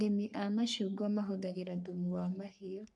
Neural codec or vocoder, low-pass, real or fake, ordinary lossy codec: codec, 44.1 kHz, 3.4 kbps, Pupu-Codec; 10.8 kHz; fake; none